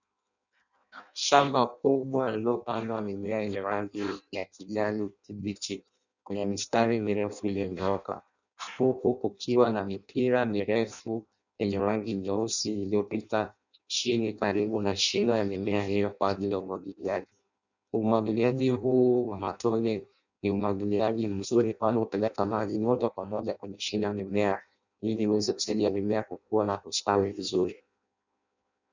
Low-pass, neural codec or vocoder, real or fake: 7.2 kHz; codec, 16 kHz in and 24 kHz out, 0.6 kbps, FireRedTTS-2 codec; fake